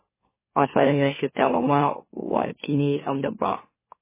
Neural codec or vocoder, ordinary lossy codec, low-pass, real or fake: autoencoder, 44.1 kHz, a latent of 192 numbers a frame, MeloTTS; MP3, 16 kbps; 3.6 kHz; fake